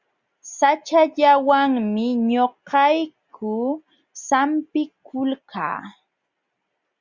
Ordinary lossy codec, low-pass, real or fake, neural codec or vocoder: Opus, 64 kbps; 7.2 kHz; real; none